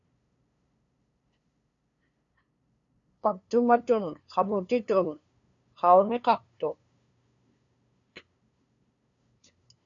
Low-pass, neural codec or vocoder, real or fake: 7.2 kHz; codec, 16 kHz, 2 kbps, FunCodec, trained on LibriTTS, 25 frames a second; fake